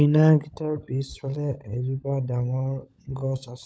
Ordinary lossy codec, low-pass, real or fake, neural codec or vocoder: none; none; fake; codec, 16 kHz, 16 kbps, FunCodec, trained on LibriTTS, 50 frames a second